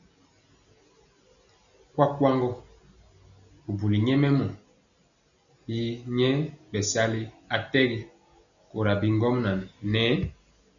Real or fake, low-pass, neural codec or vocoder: real; 7.2 kHz; none